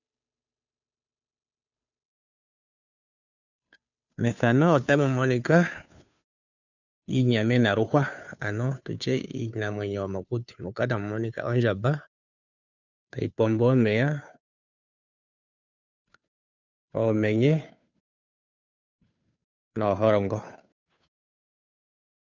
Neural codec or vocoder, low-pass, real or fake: codec, 16 kHz, 2 kbps, FunCodec, trained on Chinese and English, 25 frames a second; 7.2 kHz; fake